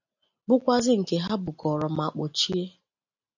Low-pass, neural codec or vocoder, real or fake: 7.2 kHz; none; real